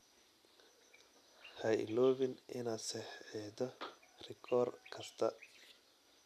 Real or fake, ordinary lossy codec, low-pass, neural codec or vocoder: real; none; 14.4 kHz; none